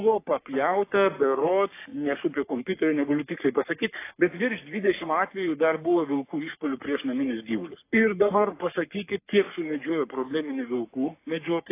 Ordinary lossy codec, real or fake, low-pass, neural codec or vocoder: AAC, 24 kbps; fake; 3.6 kHz; codec, 44.1 kHz, 3.4 kbps, Pupu-Codec